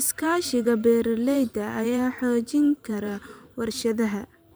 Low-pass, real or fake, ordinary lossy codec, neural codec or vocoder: none; fake; none; vocoder, 44.1 kHz, 128 mel bands every 512 samples, BigVGAN v2